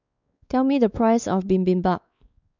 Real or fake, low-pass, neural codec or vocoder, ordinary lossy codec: fake; 7.2 kHz; codec, 16 kHz, 4 kbps, X-Codec, WavLM features, trained on Multilingual LibriSpeech; none